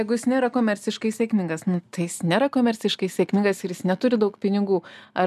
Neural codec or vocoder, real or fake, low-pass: none; real; 14.4 kHz